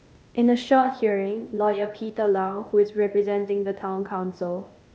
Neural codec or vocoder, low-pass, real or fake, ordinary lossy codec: codec, 16 kHz, 0.8 kbps, ZipCodec; none; fake; none